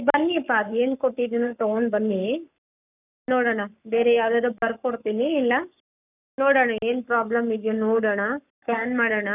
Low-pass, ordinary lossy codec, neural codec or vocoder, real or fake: 3.6 kHz; none; codec, 44.1 kHz, 7.8 kbps, Pupu-Codec; fake